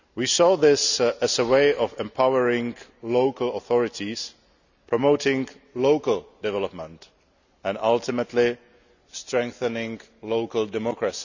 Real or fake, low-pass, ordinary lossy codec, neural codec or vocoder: real; 7.2 kHz; none; none